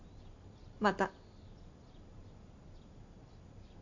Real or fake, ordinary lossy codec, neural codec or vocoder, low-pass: real; MP3, 64 kbps; none; 7.2 kHz